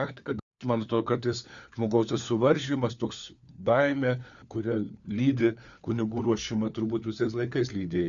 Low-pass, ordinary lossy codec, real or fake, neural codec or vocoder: 7.2 kHz; Opus, 64 kbps; fake; codec, 16 kHz, 4 kbps, FunCodec, trained on LibriTTS, 50 frames a second